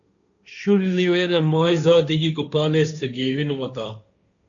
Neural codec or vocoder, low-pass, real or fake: codec, 16 kHz, 1.1 kbps, Voila-Tokenizer; 7.2 kHz; fake